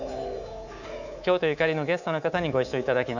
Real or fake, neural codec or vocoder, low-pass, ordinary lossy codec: fake; codec, 24 kHz, 3.1 kbps, DualCodec; 7.2 kHz; none